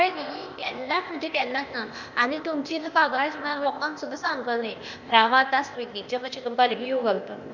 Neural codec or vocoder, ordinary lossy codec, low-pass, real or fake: codec, 16 kHz, 0.8 kbps, ZipCodec; none; 7.2 kHz; fake